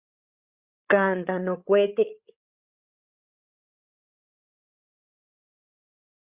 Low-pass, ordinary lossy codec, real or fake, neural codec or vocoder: 3.6 kHz; Opus, 64 kbps; fake; codec, 16 kHz, 8 kbps, FreqCodec, larger model